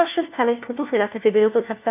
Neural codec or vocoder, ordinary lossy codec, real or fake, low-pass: codec, 16 kHz, 1 kbps, FunCodec, trained on LibriTTS, 50 frames a second; none; fake; 3.6 kHz